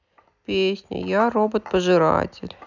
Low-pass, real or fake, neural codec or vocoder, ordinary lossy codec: 7.2 kHz; real; none; none